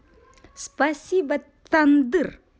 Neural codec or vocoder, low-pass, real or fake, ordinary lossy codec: none; none; real; none